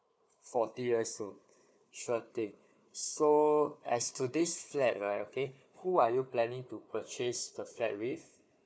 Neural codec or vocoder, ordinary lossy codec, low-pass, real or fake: codec, 16 kHz, 4 kbps, FunCodec, trained on Chinese and English, 50 frames a second; none; none; fake